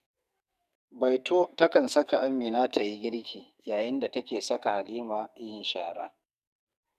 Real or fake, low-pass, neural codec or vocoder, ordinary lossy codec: fake; 14.4 kHz; codec, 44.1 kHz, 2.6 kbps, SNAC; none